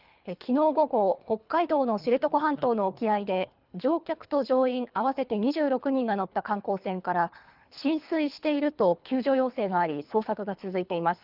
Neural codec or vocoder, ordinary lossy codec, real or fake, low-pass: codec, 24 kHz, 3 kbps, HILCodec; Opus, 32 kbps; fake; 5.4 kHz